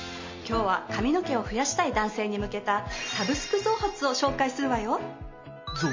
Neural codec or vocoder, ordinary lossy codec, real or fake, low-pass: none; MP3, 32 kbps; real; 7.2 kHz